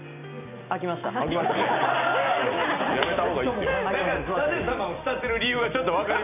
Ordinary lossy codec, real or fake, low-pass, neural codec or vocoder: none; real; 3.6 kHz; none